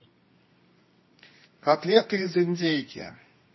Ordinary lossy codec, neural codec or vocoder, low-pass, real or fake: MP3, 24 kbps; codec, 24 kHz, 0.9 kbps, WavTokenizer, medium music audio release; 7.2 kHz; fake